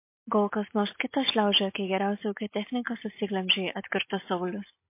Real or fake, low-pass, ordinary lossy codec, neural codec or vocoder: real; 3.6 kHz; MP3, 24 kbps; none